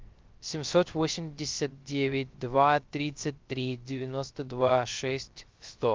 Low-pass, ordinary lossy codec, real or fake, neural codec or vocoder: 7.2 kHz; Opus, 32 kbps; fake; codec, 16 kHz, 0.3 kbps, FocalCodec